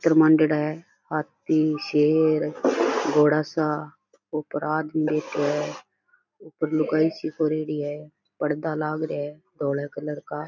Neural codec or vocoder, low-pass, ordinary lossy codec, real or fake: none; 7.2 kHz; none; real